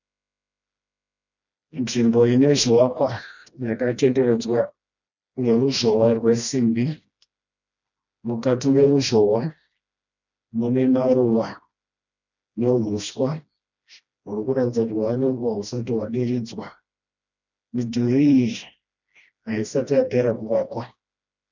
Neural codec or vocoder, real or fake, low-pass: codec, 16 kHz, 1 kbps, FreqCodec, smaller model; fake; 7.2 kHz